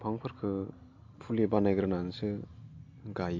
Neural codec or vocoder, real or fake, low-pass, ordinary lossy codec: none; real; 7.2 kHz; MP3, 64 kbps